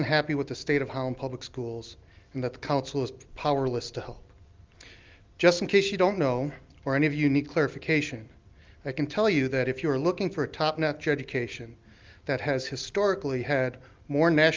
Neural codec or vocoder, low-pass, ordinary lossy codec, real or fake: none; 7.2 kHz; Opus, 32 kbps; real